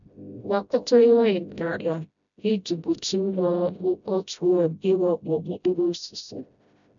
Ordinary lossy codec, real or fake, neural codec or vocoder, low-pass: MP3, 96 kbps; fake; codec, 16 kHz, 0.5 kbps, FreqCodec, smaller model; 7.2 kHz